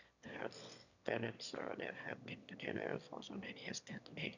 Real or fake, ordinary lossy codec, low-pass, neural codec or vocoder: fake; none; 7.2 kHz; autoencoder, 22.05 kHz, a latent of 192 numbers a frame, VITS, trained on one speaker